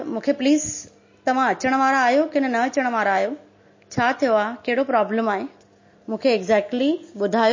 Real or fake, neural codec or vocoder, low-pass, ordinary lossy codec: real; none; 7.2 kHz; MP3, 32 kbps